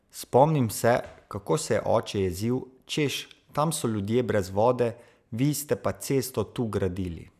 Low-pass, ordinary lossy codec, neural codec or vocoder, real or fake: 14.4 kHz; none; none; real